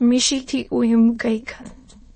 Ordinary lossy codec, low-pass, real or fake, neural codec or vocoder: MP3, 32 kbps; 9.9 kHz; fake; autoencoder, 22.05 kHz, a latent of 192 numbers a frame, VITS, trained on many speakers